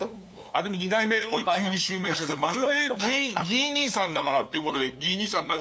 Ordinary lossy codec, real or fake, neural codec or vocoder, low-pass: none; fake; codec, 16 kHz, 2 kbps, FunCodec, trained on LibriTTS, 25 frames a second; none